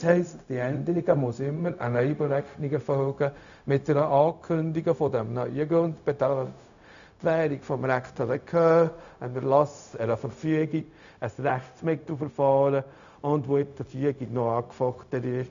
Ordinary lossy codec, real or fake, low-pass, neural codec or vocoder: MP3, 96 kbps; fake; 7.2 kHz; codec, 16 kHz, 0.4 kbps, LongCat-Audio-Codec